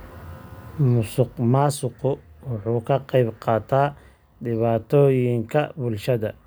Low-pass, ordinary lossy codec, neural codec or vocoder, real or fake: none; none; none; real